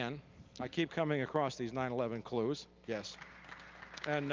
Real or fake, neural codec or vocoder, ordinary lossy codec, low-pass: real; none; Opus, 32 kbps; 7.2 kHz